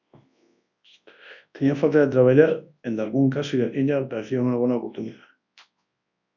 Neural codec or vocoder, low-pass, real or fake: codec, 24 kHz, 0.9 kbps, WavTokenizer, large speech release; 7.2 kHz; fake